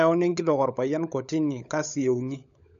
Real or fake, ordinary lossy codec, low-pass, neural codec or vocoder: fake; none; 7.2 kHz; codec, 16 kHz, 16 kbps, FunCodec, trained on LibriTTS, 50 frames a second